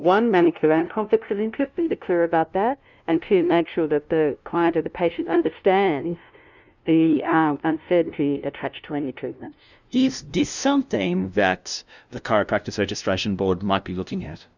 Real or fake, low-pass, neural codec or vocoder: fake; 7.2 kHz; codec, 16 kHz, 0.5 kbps, FunCodec, trained on LibriTTS, 25 frames a second